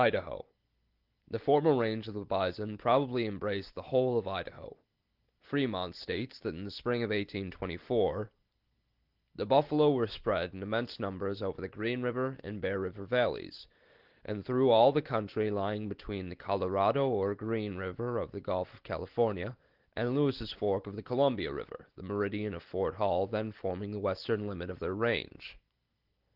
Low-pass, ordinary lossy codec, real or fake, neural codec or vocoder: 5.4 kHz; Opus, 16 kbps; real; none